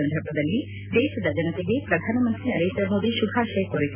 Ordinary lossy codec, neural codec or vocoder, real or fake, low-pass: none; none; real; 3.6 kHz